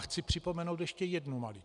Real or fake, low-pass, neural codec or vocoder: real; 10.8 kHz; none